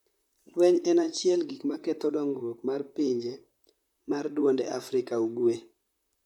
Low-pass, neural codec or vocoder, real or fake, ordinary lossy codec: 19.8 kHz; vocoder, 44.1 kHz, 128 mel bands, Pupu-Vocoder; fake; none